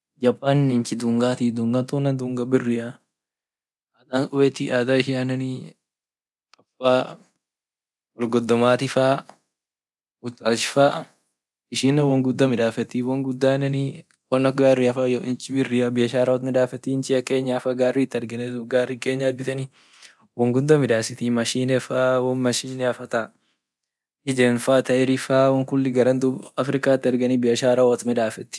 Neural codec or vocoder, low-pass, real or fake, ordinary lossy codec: codec, 24 kHz, 0.9 kbps, DualCodec; none; fake; none